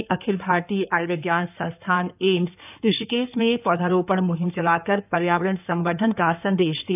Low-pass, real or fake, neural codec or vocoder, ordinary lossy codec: 3.6 kHz; fake; codec, 16 kHz in and 24 kHz out, 2.2 kbps, FireRedTTS-2 codec; none